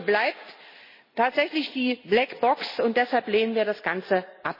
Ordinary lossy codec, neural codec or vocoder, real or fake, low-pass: MP3, 24 kbps; none; real; 5.4 kHz